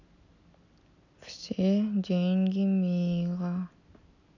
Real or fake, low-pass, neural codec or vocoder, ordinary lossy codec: real; 7.2 kHz; none; none